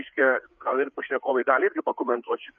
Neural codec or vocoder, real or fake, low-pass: codec, 16 kHz, 4 kbps, FreqCodec, larger model; fake; 7.2 kHz